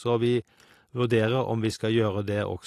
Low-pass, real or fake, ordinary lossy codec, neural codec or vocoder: 14.4 kHz; real; AAC, 48 kbps; none